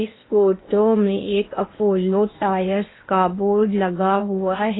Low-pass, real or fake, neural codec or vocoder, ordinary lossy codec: 7.2 kHz; fake; codec, 16 kHz in and 24 kHz out, 0.8 kbps, FocalCodec, streaming, 65536 codes; AAC, 16 kbps